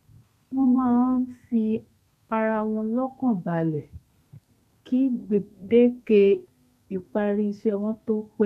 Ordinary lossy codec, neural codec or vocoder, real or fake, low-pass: none; codec, 32 kHz, 1.9 kbps, SNAC; fake; 14.4 kHz